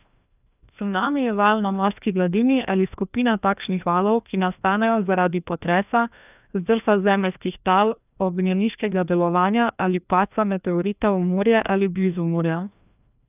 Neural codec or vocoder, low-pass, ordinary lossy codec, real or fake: codec, 16 kHz, 1 kbps, FreqCodec, larger model; 3.6 kHz; none; fake